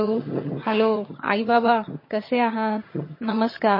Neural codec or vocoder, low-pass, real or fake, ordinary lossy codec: vocoder, 22.05 kHz, 80 mel bands, HiFi-GAN; 5.4 kHz; fake; MP3, 24 kbps